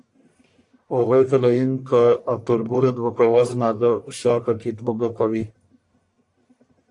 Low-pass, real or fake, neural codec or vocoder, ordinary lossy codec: 10.8 kHz; fake; codec, 44.1 kHz, 1.7 kbps, Pupu-Codec; AAC, 64 kbps